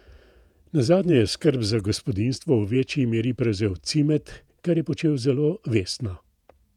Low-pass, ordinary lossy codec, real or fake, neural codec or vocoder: 19.8 kHz; none; fake; vocoder, 48 kHz, 128 mel bands, Vocos